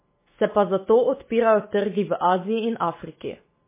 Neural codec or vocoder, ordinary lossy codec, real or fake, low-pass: autoencoder, 48 kHz, 128 numbers a frame, DAC-VAE, trained on Japanese speech; MP3, 16 kbps; fake; 3.6 kHz